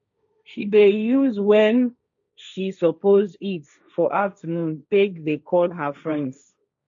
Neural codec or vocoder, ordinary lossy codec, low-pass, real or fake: codec, 16 kHz, 1.1 kbps, Voila-Tokenizer; none; 7.2 kHz; fake